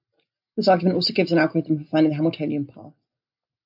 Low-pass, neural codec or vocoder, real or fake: 5.4 kHz; none; real